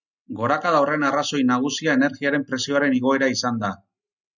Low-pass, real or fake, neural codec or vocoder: 7.2 kHz; real; none